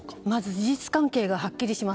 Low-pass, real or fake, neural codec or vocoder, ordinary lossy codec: none; real; none; none